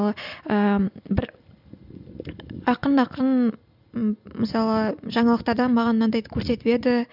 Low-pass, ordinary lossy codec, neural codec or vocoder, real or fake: 5.4 kHz; none; none; real